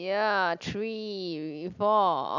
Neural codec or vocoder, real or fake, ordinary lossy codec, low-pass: none; real; none; 7.2 kHz